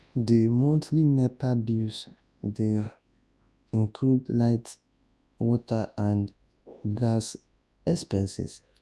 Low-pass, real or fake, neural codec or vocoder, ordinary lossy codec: none; fake; codec, 24 kHz, 0.9 kbps, WavTokenizer, large speech release; none